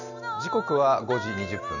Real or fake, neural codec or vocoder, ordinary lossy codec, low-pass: real; none; none; 7.2 kHz